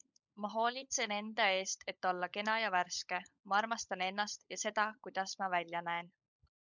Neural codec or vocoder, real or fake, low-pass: codec, 16 kHz, 16 kbps, FunCodec, trained on LibriTTS, 50 frames a second; fake; 7.2 kHz